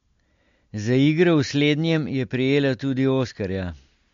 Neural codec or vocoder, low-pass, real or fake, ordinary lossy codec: none; 7.2 kHz; real; MP3, 48 kbps